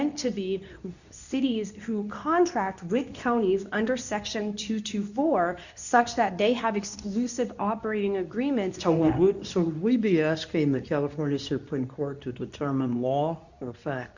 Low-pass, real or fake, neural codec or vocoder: 7.2 kHz; fake; codec, 24 kHz, 0.9 kbps, WavTokenizer, medium speech release version 2